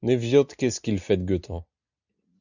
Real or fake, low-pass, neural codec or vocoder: real; 7.2 kHz; none